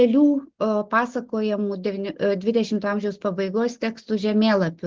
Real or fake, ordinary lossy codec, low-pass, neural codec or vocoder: real; Opus, 16 kbps; 7.2 kHz; none